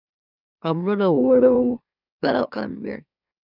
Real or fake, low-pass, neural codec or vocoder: fake; 5.4 kHz; autoencoder, 44.1 kHz, a latent of 192 numbers a frame, MeloTTS